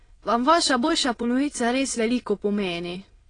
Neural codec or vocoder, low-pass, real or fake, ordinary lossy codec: autoencoder, 22.05 kHz, a latent of 192 numbers a frame, VITS, trained on many speakers; 9.9 kHz; fake; AAC, 32 kbps